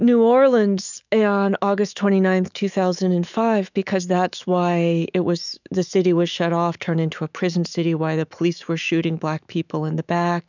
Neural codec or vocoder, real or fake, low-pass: autoencoder, 48 kHz, 128 numbers a frame, DAC-VAE, trained on Japanese speech; fake; 7.2 kHz